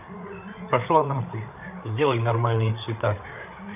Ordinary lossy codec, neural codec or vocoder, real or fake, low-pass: none; codec, 16 kHz, 8 kbps, FreqCodec, larger model; fake; 3.6 kHz